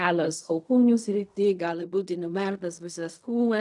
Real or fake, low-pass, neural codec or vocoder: fake; 10.8 kHz; codec, 16 kHz in and 24 kHz out, 0.4 kbps, LongCat-Audio-Codec, fine tuned four codebook decoder